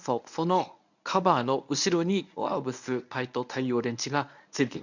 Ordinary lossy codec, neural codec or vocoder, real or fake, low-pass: none; codec, 24 kHz, 0.9 kbps, WavTokenizer, medium speech release version 1; fake; 7.2 kHz